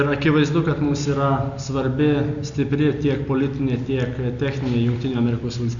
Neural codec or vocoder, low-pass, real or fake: none; 7.2 kHz; real